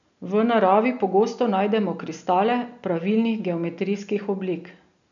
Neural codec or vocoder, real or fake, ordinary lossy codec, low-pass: none; real; none; 7.2 kHz